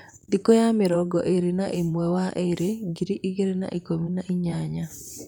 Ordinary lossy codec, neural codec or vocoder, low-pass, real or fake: none; vocoder, 44.1 kHz, 128 mel bands, Pupu-Vocoder; none; fake